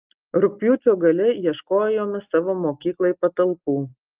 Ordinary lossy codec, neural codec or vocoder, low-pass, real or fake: Opus, 32 kbps; none; 3.6 kHz; real